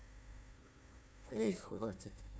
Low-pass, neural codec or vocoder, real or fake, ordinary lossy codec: none; codec, 16 kHz, 1 kbps, FunCodec, trained on Chinese and English, 50 frames a second; fake; none